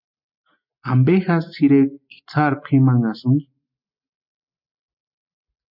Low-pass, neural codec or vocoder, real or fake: 5.4 kHz; none; real